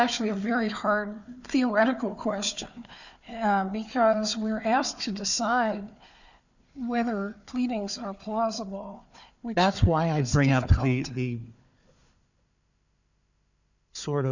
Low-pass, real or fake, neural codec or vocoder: 7.2 kHz; fake; codec, 16 kHz, 4 kbps, FunCodec, trained on Chinese and English, 50 frames a second